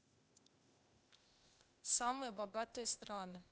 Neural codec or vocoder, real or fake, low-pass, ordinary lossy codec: codec, 16 kHz, 0.8 kbps, ZipCodec; fake; none; none